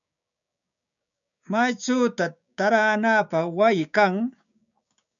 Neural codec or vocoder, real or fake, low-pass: codec, 16 kHz, 6 kbps, DAC; fake; 7.2 kHz